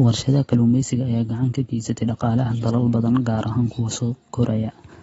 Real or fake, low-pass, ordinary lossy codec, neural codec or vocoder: real; 19.8 kHz; AAC, 24 kbps; none